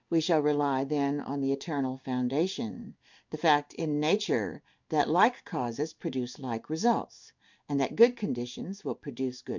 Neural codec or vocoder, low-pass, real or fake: codec, 16 kHz in and 24 kHz out, 1 kbps, XY-Tokenizer; 7.2 kHz; fake